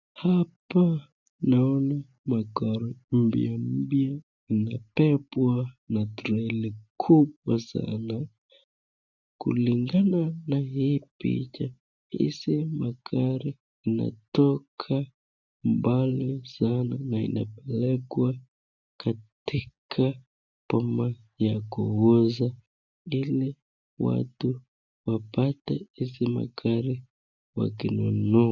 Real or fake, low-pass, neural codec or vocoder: real; 7.2 kHz; none